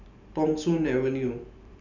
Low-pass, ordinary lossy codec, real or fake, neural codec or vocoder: 7.2 kHz; none; fake; vocoder, 44.1 kHz, 128 mel bands every 512 samples, BigVGAN v2